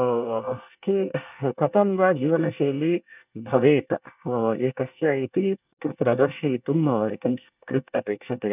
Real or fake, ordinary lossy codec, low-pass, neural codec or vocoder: fake; none; 3.6 kHz; codec, 24 kHz, 1 kbps, SNAC